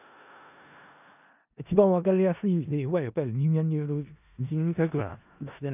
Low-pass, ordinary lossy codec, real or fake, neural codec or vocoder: 3.6 kHz; none; fake; codec, 16 kHz in and 24 kHz out, 0.4 kbps, LongCat-Audio-Codec, four codebook decoder